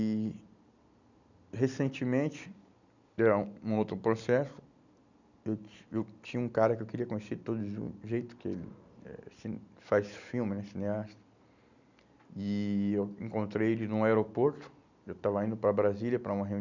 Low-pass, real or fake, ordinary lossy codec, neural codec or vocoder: 7.2 kHz; real; none; none